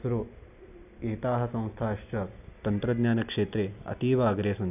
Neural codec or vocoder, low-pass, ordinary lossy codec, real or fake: none; 3.6 kHz; none; real